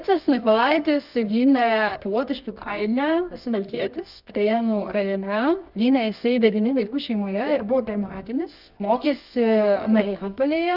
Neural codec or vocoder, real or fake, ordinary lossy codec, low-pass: codec, 24 kHz, 0.9 kbps, WavTokenizer, medium music audio release; fake; Opus, 64 kbps; 5.4 kHz